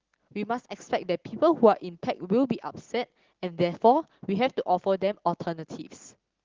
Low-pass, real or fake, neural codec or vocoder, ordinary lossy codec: 7.2 kHz; real; none; Opus, 16 kbps